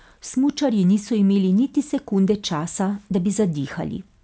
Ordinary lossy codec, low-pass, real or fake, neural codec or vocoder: none; none; real; none